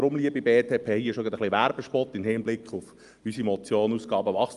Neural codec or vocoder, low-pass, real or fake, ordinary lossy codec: none; 10.8 kHz; real; Opus, 32 kbps